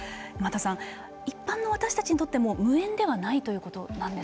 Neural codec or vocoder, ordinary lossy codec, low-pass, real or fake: none; none; none; real